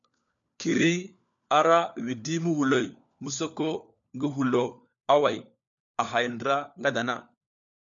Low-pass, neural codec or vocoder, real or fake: 7.2 kHz; codec, 16 kHz, 4 kbps, FunCodec, trained on LibriTTS, 50 frames a second; fake